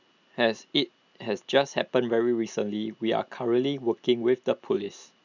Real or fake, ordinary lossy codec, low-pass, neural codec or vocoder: real; none; 7.2 kHz; none